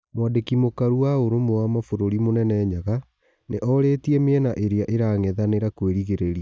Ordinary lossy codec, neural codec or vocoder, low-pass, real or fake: none; none; none; real